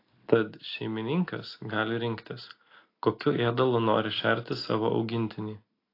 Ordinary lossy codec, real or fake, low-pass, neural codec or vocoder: AAC, 32 kbps; real; 5.4 kHz; none